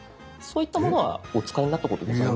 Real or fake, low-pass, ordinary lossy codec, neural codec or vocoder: real; none; none; none